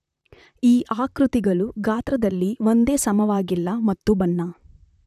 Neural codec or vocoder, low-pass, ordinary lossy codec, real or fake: vocoder, 44.1 kHz, 128 mel bands, Pupu-Vocoder; 14.4 kHz; none; fake